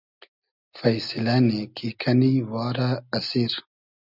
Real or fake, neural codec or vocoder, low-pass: real; none; 5.4 kHz